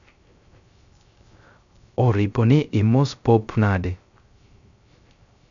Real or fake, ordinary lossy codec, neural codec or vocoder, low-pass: fake; MP3, 96 kbps; codec, 16 kHz, 0.3 kbps, FocalCodec; 7.2 kHz